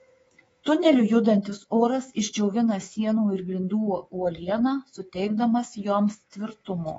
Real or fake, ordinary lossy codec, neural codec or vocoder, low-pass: fake; AAC, 24 kbps; codec, 24 kHz, 3.1 kbps, DualCodec; 10.8 kHz